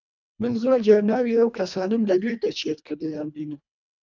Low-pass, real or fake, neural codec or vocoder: 7.2 kHz; fake; codec, 24 kHz, 1.5 kbps, HILCodec